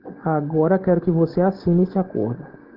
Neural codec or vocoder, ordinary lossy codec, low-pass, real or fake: none; Opus, 16 kbps; 5.4 kHz; real